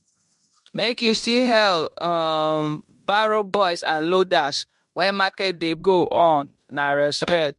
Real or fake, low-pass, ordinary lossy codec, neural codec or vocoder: fake; 10.8 kHz; MP3, 64 kbps; codec, 16 kHz in and 24 kHz out, 0.9 kbps, LongCat-Audio-Codec, fine tuned four codebook decoder